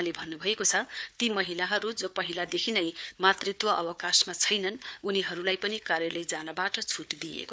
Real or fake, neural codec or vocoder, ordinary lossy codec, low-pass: fake; codec, 16 kHz, 4 kbps, FunCodec, trained on Chinese and English, 50 frames a second; none; none